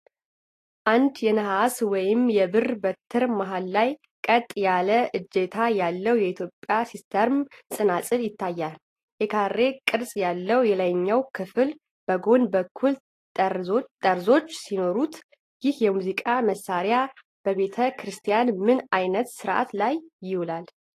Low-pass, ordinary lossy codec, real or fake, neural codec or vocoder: 14.4 kHz; AAC, 48 kbps; real; none